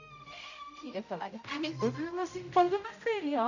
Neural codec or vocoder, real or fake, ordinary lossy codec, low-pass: codec, 16 kHz, 0.5 kbps, X-Codec, HuBERT features, trained on general audio; fake; none; 7.2 kHz